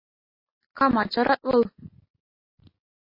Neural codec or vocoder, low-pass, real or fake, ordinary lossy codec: none; 5.4 kHz; real; MP3, 24 kbps